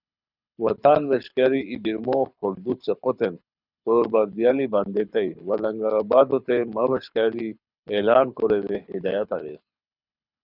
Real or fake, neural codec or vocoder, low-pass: fake; codec, 24 kHz, 6 kbps, HILCodec; 5.4 kHz